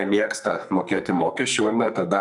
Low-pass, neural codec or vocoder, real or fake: 10.8 kHz; codec, 44.1 kHz, 2.6 kbps, SNAC; fake